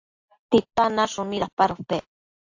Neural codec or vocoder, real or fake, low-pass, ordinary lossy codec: none; real; 7.2 kHz; AAC, 48 kbps